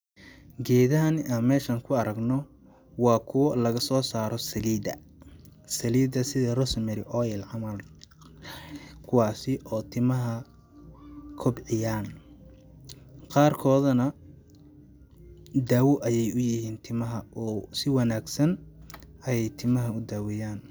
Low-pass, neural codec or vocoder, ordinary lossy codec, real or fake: none; none; none; real